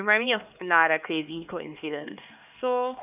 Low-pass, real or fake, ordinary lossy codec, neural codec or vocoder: 3.6 kHz; fake; none; codec, 16 kHz, 2 kbps, X-Codec, HuBERT features, trained on LibriSpeech